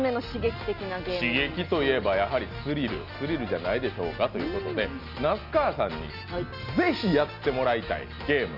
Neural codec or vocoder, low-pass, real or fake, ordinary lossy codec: none; 5.4 kHz; real; Opus, 64 kbps